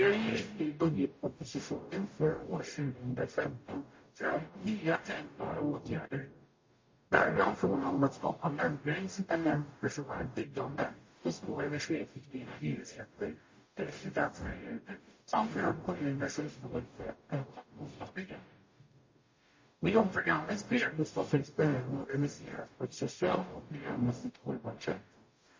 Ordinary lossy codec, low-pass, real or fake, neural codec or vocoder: MP3, 32 kbps; 7.2 kHz; fake; codec, 44.1 kHz, 0.9 kbps, DAC